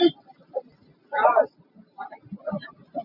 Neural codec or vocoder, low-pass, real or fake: none; 5.4 kHz; real